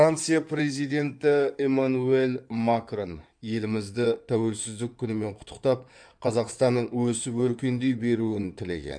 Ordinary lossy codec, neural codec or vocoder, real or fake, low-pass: none; codec, 16 kHz in and 24 kHz out, 2.2 kbps, FireRedTTS-2 codec; fake; 9.9 kHz